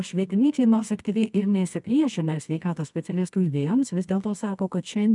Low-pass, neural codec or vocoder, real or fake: 10.8 kHz; codec, 24 kHz, 0.9 kbps, WavTokenizer, medium music audio release; fake